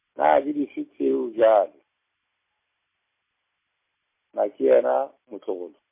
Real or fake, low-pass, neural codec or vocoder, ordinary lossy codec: fake; 3.6 kHz; vocoder, 44.1 kHz, 128 mel bands every 256 samples, BigVGAN v2; MP3, 24 kbps